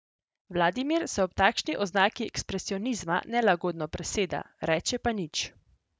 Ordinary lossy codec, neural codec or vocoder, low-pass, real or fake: none; none; none; real